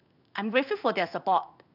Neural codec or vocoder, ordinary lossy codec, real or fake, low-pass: codec, 16 kHz in and 24 kHz out, 1 kbps, XY-Tokenizer; none; fake; 5.4 kHz